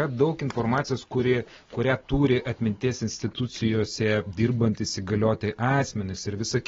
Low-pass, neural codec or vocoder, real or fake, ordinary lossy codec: 7.2 kHz; none; real; AAC, 24 kbps